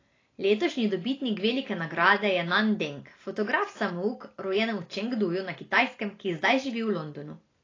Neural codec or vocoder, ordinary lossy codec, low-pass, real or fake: none; AAC, 32 kbps; 7.2 kHz; real